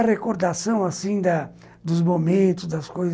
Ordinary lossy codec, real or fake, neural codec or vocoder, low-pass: none; real; none; none